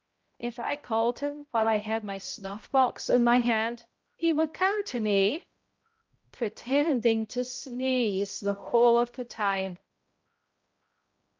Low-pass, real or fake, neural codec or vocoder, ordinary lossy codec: 7.2 kHz; fake; codec, 16 kHz, 0.5 kbps, X-Codec, HuBERT features, trained on balanced general audio; Opus, 24 kbps